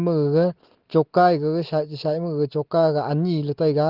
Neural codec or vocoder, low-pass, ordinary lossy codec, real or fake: none; 5.4 kHz; Opus, 16 kbps; real